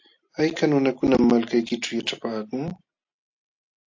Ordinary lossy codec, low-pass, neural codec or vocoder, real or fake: AAC, 48 kbps; 7.2 kHz; none; real